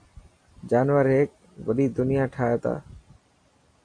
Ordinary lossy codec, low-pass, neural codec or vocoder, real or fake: AAC, 48 kbps; 9.9 kHz; none; real